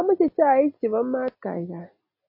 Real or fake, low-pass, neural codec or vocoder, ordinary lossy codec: real; 5.4 kHz; none; MP3, 24 kbps